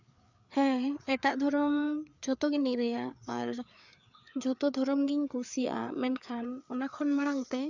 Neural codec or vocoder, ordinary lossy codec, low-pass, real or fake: codec, 16 kHz, 4 kbps, FreqCodec, larger model; none; 7.2 kHz; fake